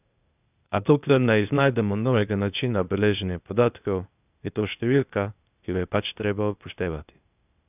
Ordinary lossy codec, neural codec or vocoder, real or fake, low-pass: none; codec, 16 kHz, 0.8 kbps, ZipCodec; fake; 3.6 kHz